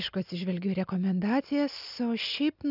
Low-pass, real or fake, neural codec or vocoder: 5.4 kHz; real; none